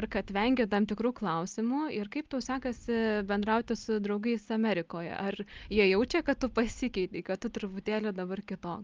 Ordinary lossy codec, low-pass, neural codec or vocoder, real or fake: Opus, 32 kbps; 7.2 kHz; none; real